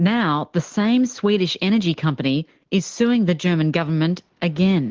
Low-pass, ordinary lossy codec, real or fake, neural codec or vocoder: 7.2 kHz; Opus, 16 kbps; real; none